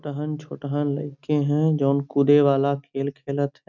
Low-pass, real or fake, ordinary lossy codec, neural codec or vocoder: 7.2 kHz; real; none; none